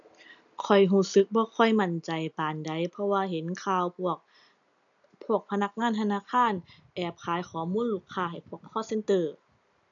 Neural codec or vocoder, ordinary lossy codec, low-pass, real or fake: none; none; 7.2 kHz; real